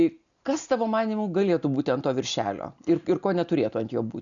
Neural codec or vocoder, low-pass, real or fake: none; 7.2 kHz; real